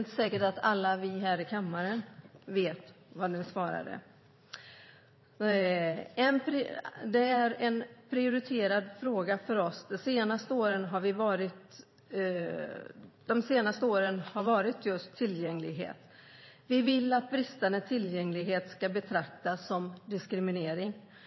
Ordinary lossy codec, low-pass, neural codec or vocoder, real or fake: MP3, 24 kbps; 7.2 kHz; vocoder, 44.1 kHz, 128 mel bands every 512 samples, BigVGAN v2; fake